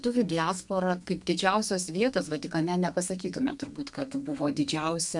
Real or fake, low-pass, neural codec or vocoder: fake; 10.8 kHz; codec, 32 kHz, 1.9 kbps, SNAC